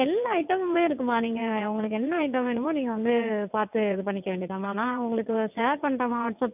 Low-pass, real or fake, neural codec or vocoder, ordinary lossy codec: 3.6 kHz; fake; vocoder, 22.05 kHz, 80 mel bands, WaveNeXt; none